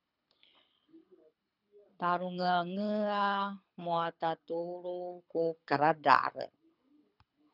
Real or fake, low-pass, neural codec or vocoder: fake; 5.4 kHz; codec, 24 kHz, 6 kbps, HILCodec